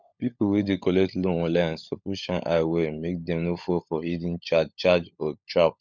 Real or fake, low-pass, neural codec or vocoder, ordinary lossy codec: fake; 7.2 kHz; codec, 16 kHz, 16 kbps, FunCodec, trained on LibriTTS, 50 frames a second; none